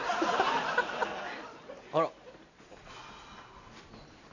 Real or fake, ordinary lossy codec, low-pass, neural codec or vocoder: real; none; 7.2 kHz; none